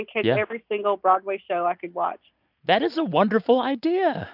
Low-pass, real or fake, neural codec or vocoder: 5.4 kHz; real; none